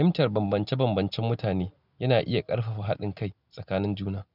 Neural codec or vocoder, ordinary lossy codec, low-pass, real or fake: none; none; 5.4 kHz; real